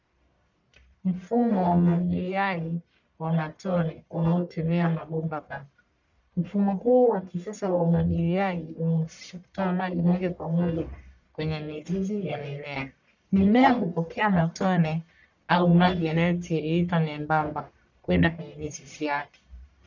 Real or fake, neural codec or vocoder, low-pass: fake; codec, 44.1 kHz, 1.7 kbps, Pupu-Codec; 7.2 kHz